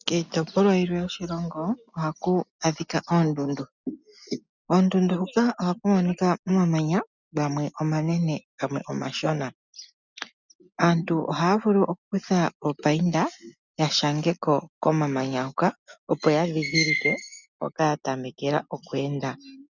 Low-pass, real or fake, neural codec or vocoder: 7.2 kHz; real; none